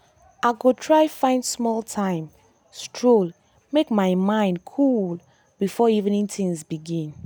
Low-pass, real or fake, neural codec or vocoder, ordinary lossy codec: none; real; none; none